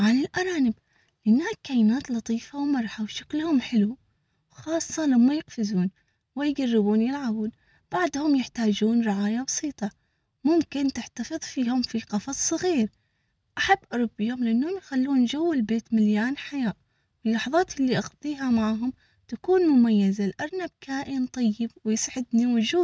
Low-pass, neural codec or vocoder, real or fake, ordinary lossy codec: none; none; real; none